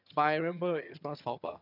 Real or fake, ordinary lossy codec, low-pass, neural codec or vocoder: fake; none; 5.4 kHz; vocoder, 22.05 kHz, 80 mel bands, HiFi-GAN